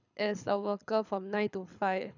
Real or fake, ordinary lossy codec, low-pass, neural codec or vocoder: fake; none; 7.2 kHz; codec, 24 kHz, 6 kbps, HILCodec